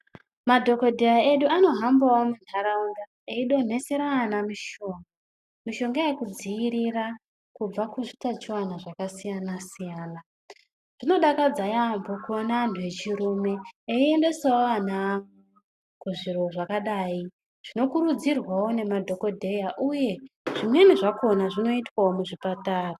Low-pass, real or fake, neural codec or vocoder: 14.4 kHz; real; none